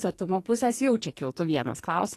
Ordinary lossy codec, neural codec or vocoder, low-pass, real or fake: AAC, 48 kbps; codec, 44.1 kHz, 2.6 kbps, SNAC; 14.4 kHz; fake